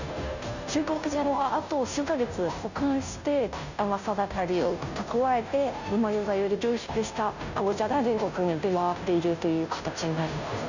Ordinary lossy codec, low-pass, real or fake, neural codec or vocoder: none; 7.2 kHz; fake; codec, 16 kHz, 0.5 kbps, FunCodec, trained on Chinese and English, 25 frames a second